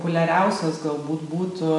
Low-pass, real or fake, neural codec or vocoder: 10.8 kHz; real; none